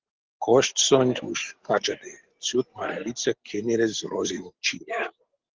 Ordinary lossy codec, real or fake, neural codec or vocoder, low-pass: Opus, 16 kbps; real; none; 7.2 kHz